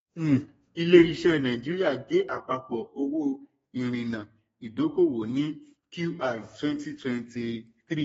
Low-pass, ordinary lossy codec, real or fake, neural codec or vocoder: 14.4 kHz; AAC, 24 kbps; fake; codec, 32 kHz, 1.9 kbps, SNAC